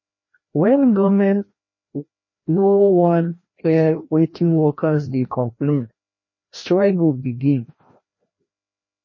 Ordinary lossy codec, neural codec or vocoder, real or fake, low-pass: MP3, 32 kbps; codec, 16 kHz, 1 kbps, FreqCodec, larger model; fake; 7.2 kHz